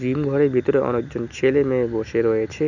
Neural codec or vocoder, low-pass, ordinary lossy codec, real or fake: none; 7.2 kHz; none; real